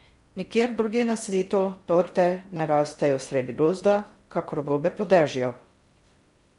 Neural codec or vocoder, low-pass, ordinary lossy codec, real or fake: codec, 16 kHz in and 24 kHz out, 0.6 kbps, FocalCodec, streaming, 4096 codes; 10.8 kHz; MP3, 64 kbps; fake